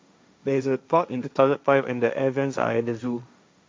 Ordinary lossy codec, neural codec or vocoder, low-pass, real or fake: none; codec, 16 kHz, 1.1 kbps, Voila-Tokenizer; none; fake